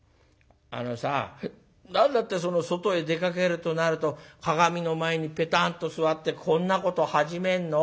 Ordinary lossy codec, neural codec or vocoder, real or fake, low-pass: none; none; real; none